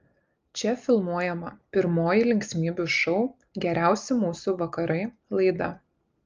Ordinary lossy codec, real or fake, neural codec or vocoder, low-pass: Opus, 24 kbps; real; none; 7.2 kHz